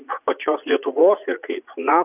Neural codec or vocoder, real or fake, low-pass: vocoder, 44.1 kHz, 80 mel bands, Vocos; fake; 3.6 kHz